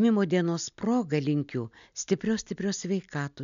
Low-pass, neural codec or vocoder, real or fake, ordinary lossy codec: 7.2 kHz; none; real; MP3, 96 kbps